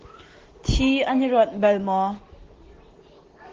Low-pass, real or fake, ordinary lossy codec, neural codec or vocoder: 7.2 kHz; real; Opus, 16 kbps; none